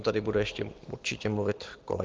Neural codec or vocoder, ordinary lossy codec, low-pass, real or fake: none; Opus, 16 kbps; 7.2 kHz; real